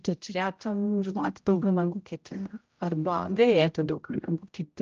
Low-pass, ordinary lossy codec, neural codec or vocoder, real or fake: 7.2 kHz; Opus, 24 kbps; codec, 16 kHz, 0.5 kbps, X-Codec, HuBERT features, trained on general audio; fake